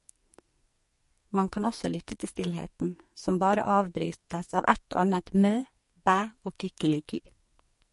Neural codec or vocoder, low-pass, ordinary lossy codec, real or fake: codec, 44.1 kHz, 2.6 kbps, SNAC; 14.4 kHz; MP3, 48 kbps; fake